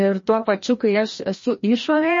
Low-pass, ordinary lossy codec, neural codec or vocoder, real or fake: 7.2 kHz; MP3, 32 kbps; codec, 16 kHz, 1 kbps, FreqCodec, larger model; fake